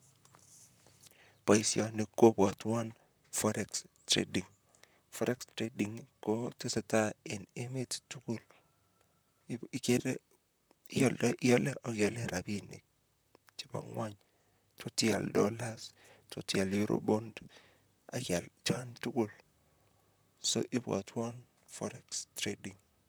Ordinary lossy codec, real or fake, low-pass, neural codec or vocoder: none; fake; none; vocoder, 44.1 kHz, 128 mel bands, Pupu-Vocoder